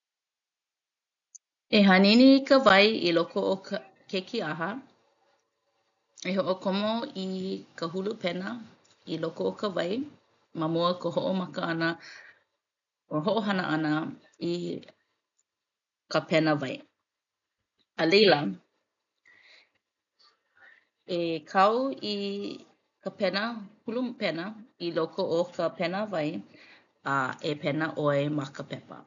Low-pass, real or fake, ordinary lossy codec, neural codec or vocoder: 7.2 kHz; real; none; none